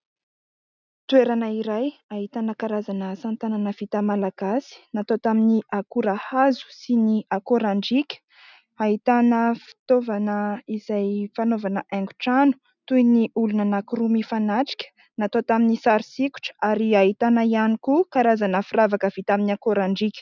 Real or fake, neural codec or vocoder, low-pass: real; none; 7.2 kHz